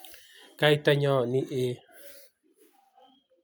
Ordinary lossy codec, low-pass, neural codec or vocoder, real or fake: none; none; none; real